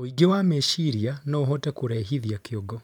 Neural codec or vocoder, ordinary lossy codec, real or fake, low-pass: vocoder, 44.1 kHz, 128 mel bands every 512 samples, BigVGAN v2; none; fake; 19.8 kHz